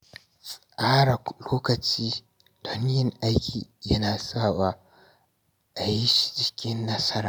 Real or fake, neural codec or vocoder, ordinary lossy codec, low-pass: real; none; none; none